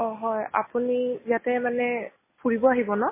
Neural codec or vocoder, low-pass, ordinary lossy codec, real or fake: none; 3.6 kHz; MP3, 16 kbps; real